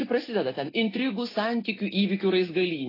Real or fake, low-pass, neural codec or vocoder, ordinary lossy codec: real; 5.4 kHz; none; AAC, 24 kbps